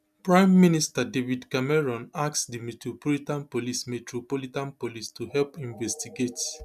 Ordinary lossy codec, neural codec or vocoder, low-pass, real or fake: none; none; 14.4 kHz; real